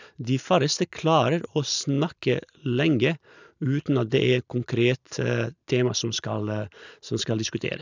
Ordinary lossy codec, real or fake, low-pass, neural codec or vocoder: none; real; 7.2 kHz; none